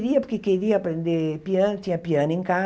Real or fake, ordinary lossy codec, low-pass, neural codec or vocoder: real; none; none; none